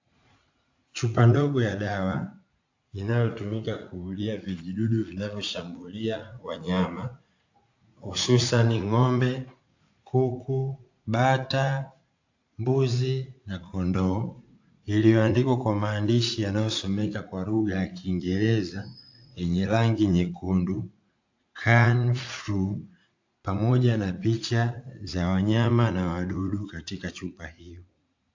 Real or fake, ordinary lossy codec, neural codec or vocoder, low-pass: fake; AAC, 48 kbps; vocoder, 44.1 kHz, 80 mel bands, Vocos; 7.2 kHz